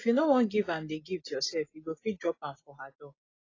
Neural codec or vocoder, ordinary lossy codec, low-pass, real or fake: none; AAC, 32 kbps; 7.2 kHz; real